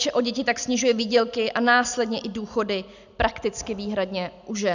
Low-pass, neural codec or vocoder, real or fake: 7.2 kHz; none; real